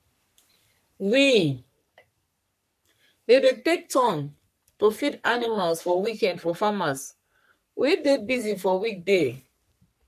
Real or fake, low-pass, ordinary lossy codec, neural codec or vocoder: fake; 14.4 kHz; none; codec, 44.1 kHz, 3.4 kbps, Pupu-Codec